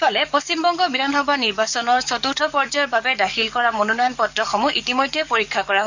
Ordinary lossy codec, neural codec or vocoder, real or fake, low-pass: Opus, 64 kbps; codec, 44.1 kHz, 7.8 kbps, DAC; fake; 7.2 kHz